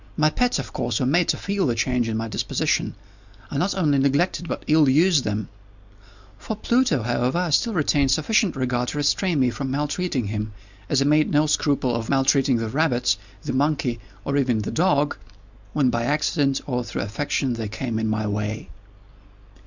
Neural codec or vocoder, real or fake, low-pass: none; real; 7.2 kHz